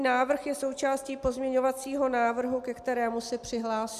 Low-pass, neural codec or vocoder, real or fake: 14.4 kHz; none; real